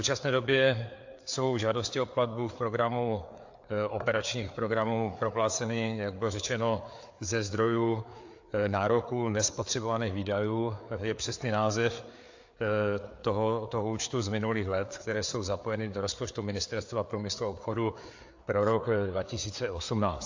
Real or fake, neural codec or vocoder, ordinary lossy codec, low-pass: fake; codec, 16 kHz, 4 kbps, FreqCodec, larger model; AAC, 48 kbps; 7.2 kHz